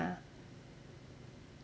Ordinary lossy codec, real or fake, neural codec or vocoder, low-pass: none; real; none; none